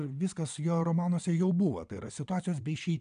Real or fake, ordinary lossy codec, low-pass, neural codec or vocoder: fake; AAC, 96 kbps; 9.9 kHz; vocoder, 22.05 kHz, 80 mel bands, WaveNeXt